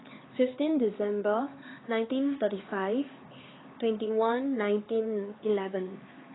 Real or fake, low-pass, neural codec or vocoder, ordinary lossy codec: fake; 7.2 kHz; codec, 16 kHz, 4 kbps, X-Codec, HuBERT features, trained on LibriSpeech; AAC, 16 kbps